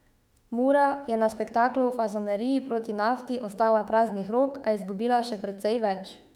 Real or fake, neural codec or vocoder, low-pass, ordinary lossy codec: fake; autoencoder, 48 kHz, 32 numbers a frame, DAC-VAE, trained on Japanese speech; 19.8 kHz; none